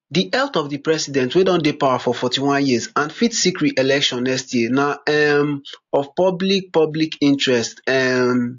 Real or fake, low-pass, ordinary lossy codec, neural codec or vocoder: real; 7.2 kHz; AAC, 48 kbps; none